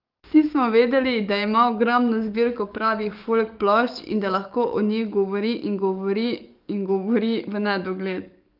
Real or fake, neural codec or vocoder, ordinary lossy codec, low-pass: fake; codec, 44.1 kHz, 7.8 kbps, DAC; Opus, 24 kbps; 5.4 kHz